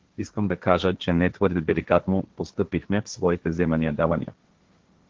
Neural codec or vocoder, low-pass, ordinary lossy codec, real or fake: codec, 16 kHz, 1.1 kbps, Voila-Tokenizer; 7.2 kHz; Opus, 16 kbps; fake